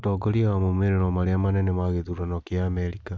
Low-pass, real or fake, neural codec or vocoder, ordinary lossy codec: none; real; none; none